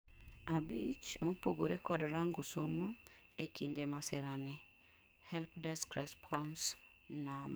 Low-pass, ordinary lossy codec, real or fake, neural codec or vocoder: none; none; fake; codec, 44.1 kHz, 2.6 kbps, SNAC